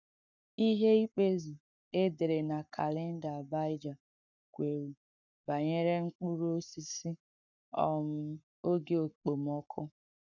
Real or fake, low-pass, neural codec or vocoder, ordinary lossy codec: fake; 7.2 kHz; codec, 44.1 kHz, 7.8 kbps, Pupu-Codec; none